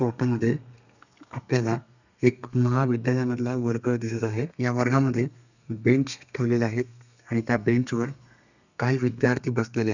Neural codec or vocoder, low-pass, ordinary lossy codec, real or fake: codec, 32 kHz, 1.9 kbps, SNAC; 7.2 kHz; none; fake